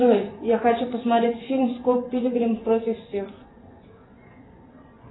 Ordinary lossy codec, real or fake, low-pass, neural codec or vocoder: AAC, 16 kbps; fake; 7.2 kHz; vocoder, 24 kHz, 100 mel bands, Vocos